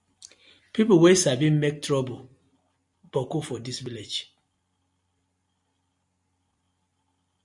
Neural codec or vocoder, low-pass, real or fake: none; 10.8 kHz; real